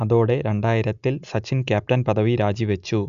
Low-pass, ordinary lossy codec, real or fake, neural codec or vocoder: 7.2 kHz; none; real; none